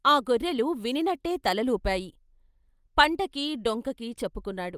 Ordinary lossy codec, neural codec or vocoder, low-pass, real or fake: Opus, 32 kbps; none; 14.4 kHz; real